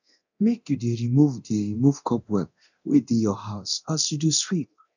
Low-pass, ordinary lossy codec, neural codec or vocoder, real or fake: 7.2 kHz; none; codec, 24 kHz, 0.9 kbps, DualCodec; fake